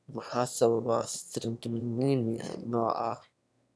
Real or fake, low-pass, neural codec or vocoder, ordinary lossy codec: fake; none; autoencoder, 22.05 kHz, a latent of 192 numbers a frame, VITS, trained on one speaker; none